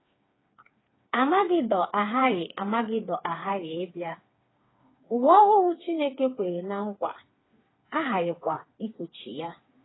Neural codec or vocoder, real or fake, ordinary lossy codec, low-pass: codec, 16 kHz, 4 kbps, FreqCodec, smaller model; fake; AAC, 16 kbps; 7.2 kHz